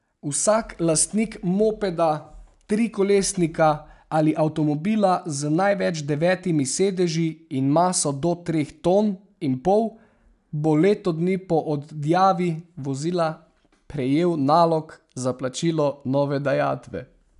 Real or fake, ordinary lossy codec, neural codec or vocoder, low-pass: real; none; none; 10.8 kHz